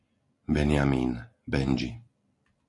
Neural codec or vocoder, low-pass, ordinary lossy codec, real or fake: none; 10.8 kHz; AAC, 48 kbps; real